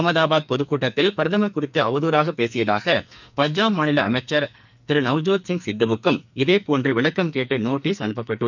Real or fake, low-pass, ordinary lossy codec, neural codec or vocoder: fake; 7.2 kHz; none; codec, 44.1 kHz, 2.6 kbps, SNAC